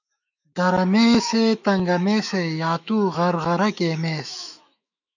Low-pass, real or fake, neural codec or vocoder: 7.2 kHz; fake; autoencoder, 48 kHz, 128 numbers a frame, DAC-VAE, trained on Japanese speech